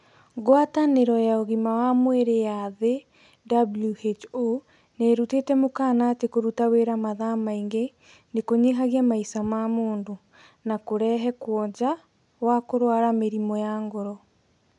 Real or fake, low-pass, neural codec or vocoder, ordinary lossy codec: real; 10.8 kHz; none; none